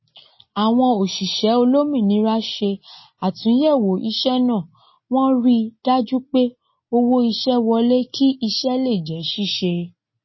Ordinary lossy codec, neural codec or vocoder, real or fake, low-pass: MP3, 24 kbps; none; real; 7.2 kHz